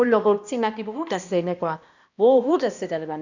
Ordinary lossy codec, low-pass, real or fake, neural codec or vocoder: none; 7.2 kHz; fake; codec, 16 kHz, 1 kbps, X-Codec, HuBERT features, trained on balanced general audio